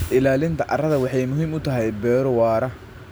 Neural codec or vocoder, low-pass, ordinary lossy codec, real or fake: none; none; none; real